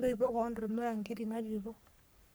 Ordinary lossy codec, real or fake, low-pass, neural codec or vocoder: none; fake; none; codec, 44.1 kHz, 3.4 kbps, Pupu-Codec